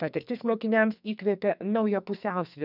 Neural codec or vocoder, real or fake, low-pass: codec, 44.1 kHz, 2.6 kbps, SNAC; fake; 5.4 kHz